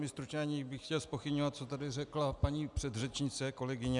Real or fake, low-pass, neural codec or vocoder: real; 10.8 kHz; none